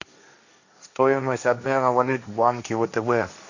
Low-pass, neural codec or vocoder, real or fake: 7.2 kHz; codec, 16 kHz, 1.1 kbps, Voila-Tokenizer; fake